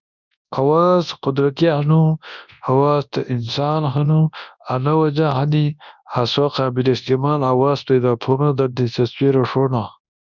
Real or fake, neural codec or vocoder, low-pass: fake; codec, 24 kHz, 0.9 kbps, WavTokenizer, large speech release; 7.2 kHz